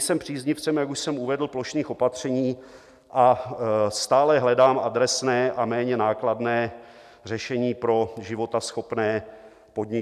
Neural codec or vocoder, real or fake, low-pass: none; real; 14.4 kHz